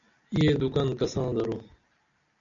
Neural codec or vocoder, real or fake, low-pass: none; real; 7.2 kHz